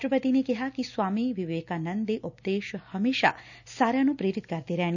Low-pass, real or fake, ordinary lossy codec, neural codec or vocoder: 7.2 kHz; real; none; none